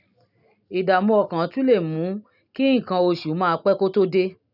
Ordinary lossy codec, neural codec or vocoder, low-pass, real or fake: none; none; 5.4 kHz; real